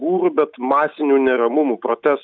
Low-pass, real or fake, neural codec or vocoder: 7.2 kHz; real; none